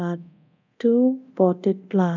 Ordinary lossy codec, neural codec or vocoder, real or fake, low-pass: none; codec, 24 kHz, 0.9 kbps, DualCodec; fake; 7.2 kHz